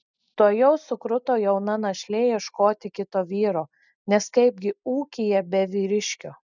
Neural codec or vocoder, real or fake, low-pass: none; real; 7.2 kHz